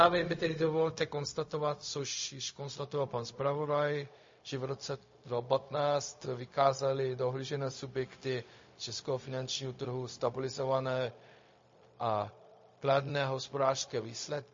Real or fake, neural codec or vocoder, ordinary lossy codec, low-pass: fake; codec, 16 kHz, 0.4 kbps, LongCat-Audio-Codec; MP3, 32 kbps; 7.2 kHz